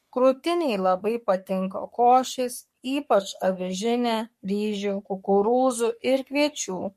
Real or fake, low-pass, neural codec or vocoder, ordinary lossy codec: fake; 14.4 kHz; codec, 44.1 kHz, 3.4 kbps, Pupu-Codec; MP3, 64 kbps